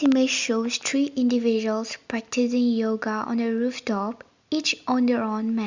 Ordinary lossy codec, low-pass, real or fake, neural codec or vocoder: Opus, 64 kbps; 7.2 kHz; real; none